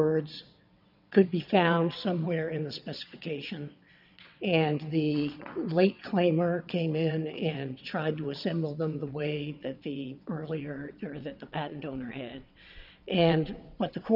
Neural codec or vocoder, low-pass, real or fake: vocoder, 22.05 kHz, 80 mel bands, WaveNeXt; 5.4 kHz; fake